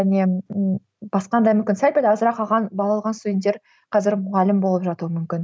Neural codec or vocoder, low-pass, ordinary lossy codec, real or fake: none; none; none; real